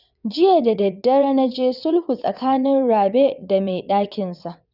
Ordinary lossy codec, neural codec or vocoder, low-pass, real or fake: none; vocoder, 22.05 kHz, 80 mel bands, WaveNeXt; 5.4 kHz; fake